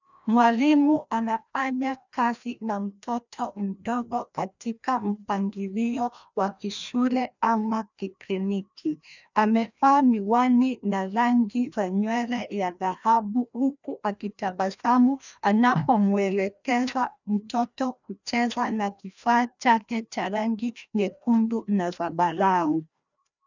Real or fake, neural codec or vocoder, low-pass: fake; codec, 16 kHz, 1 kbps, FreqCodec, larger model; 7.2 kHz